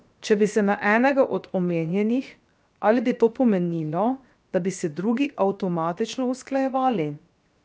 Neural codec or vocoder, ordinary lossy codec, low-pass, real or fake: codec, 16 kHz, 0.7 kbps, FocalCodec; none; none; fake